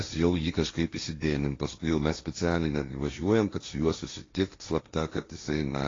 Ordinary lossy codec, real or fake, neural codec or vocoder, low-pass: AAC, 32 kbps; fake; codec, 16 kHz, 1.1 kbps, Voila-Tokenizer; 7.2 kHz